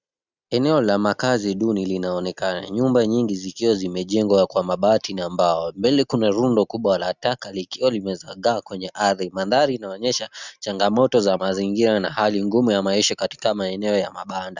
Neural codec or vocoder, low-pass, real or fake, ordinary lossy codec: none; 7.2 kHz; real; Opus, 64 kbps